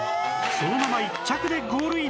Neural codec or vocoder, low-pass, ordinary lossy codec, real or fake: none; none; none; real